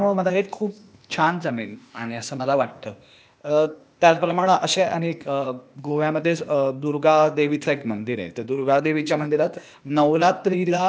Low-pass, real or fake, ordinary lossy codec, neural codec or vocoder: none; fake; none; codec, 16 kHz, 0.8 kbps, ZipCodec